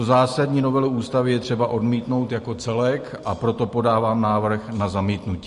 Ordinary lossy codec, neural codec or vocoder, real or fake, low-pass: MP3, 48 kbps; none; real; 14.4 kHz